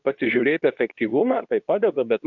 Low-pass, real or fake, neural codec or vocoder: 7.2 kHz; fake; codec, 24 kHz, 0.9 kbps, WavTokenizer, medium speech release version 2